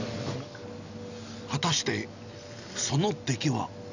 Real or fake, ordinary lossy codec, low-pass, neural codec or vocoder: real; none; 7.2 kHz; none